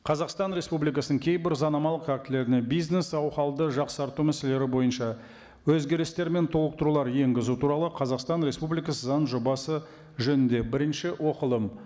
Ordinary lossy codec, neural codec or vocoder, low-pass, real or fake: none; none; none; real